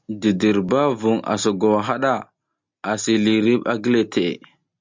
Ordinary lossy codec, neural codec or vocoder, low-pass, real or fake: MP3, 64 kbps; none; 7.2 kHz; real